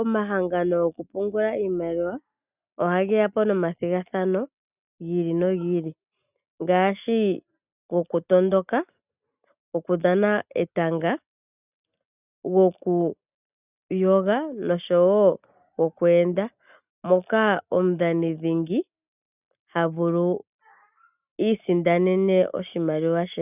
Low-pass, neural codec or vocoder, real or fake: 3.6 kHz; none; real